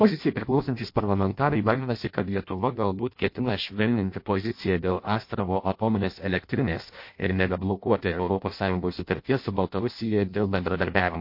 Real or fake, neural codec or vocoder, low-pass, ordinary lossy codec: fake; codec, 16 kHz in and 24 kHz out, 0.6 kbps, FireRedTTS-2 codec; 5.4 kHz; MP3, 32 kbps